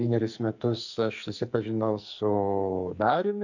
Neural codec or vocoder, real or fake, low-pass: codec, 44.1 kHz, 2.6 kbps, SNAC; fake; 7.2 kHz